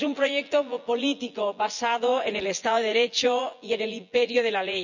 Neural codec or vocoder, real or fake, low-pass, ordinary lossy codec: vocoder, 24 kHz, 100 mel bands, Vocos; fake; 7.2 kHz; none